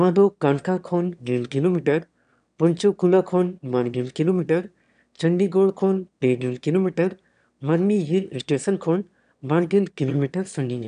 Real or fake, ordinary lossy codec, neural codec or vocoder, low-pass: fake; none; autoencoder, 22.05 kHz, a latent of 192 numbers a frame, VITS, trained on one speaker; 9.9 kHz